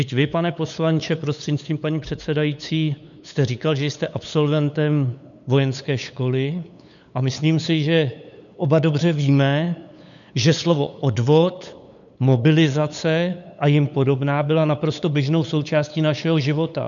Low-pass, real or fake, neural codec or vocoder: 7.2 kHz; fake; codec, 16 kHz, 8 kbps, FunCodec, trained on LibriTTS, 25 frames a second